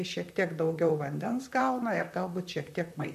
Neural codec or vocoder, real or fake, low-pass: vocoder, 44.1 kHz, 128 mel bands, Pupu-Vocoder; fake; 14.4 kHz